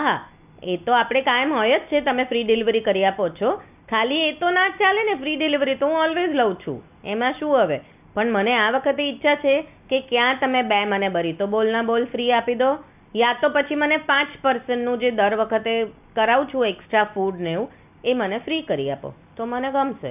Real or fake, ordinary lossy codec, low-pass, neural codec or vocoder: real; none; 3.6 kHz; none